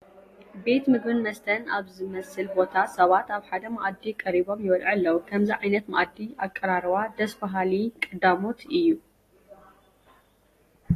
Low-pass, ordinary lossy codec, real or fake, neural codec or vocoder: 14.4 kHz; AAC, 48 kbps; real; none